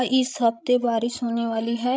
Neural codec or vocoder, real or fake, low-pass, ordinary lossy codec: codec, 16 kHz, 16 kbps, FreqCodec, larger model; fake; none; none